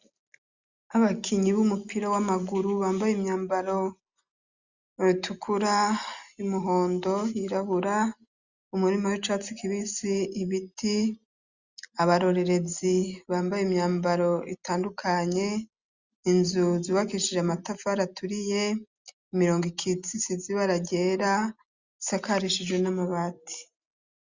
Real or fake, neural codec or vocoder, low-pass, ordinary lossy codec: real; none; 7.2 kHz; Opus, 64 kbps